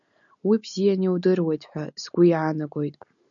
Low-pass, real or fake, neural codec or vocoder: 7.2 kHz; real; none